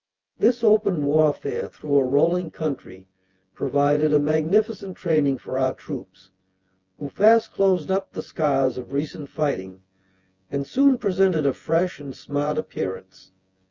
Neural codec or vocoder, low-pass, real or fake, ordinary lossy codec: vocoder, 24 kHz, 100 mel bands, Vocos; 7.2 kHz; fake; Opus, 32 kbps